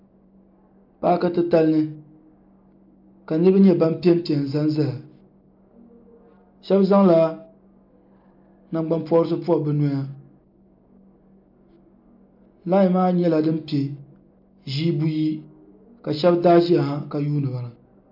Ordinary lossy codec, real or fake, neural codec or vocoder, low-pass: MP3, 48 kbps; real; none; 5.4 kHz